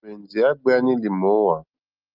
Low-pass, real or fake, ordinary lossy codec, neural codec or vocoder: 5.4 kHz; real; Opus, 24 kbps; none